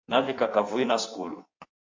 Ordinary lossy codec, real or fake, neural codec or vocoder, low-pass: MP3, 48 kbps; fake; codec, 16 kHz in and 24 kHz out, 1.1 kbps, FireRedTTS-2 codec; 7.2 kHz